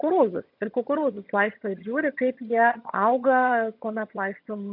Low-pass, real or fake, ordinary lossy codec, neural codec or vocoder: 5.4 kHz; fake; MP3, 48 kbps; vocoder, 22.05 kHz, 80 mel bands, HiFi-GAN